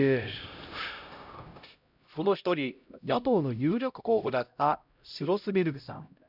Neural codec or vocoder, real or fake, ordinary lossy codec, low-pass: codec, 16 kHz, 0.5 kbps, X-Codec, HuBERT features, trained on LibriSpeech; fake; none; 5.4 kHz